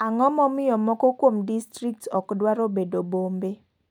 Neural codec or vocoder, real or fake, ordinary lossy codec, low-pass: none; real; none; 19.8 kHz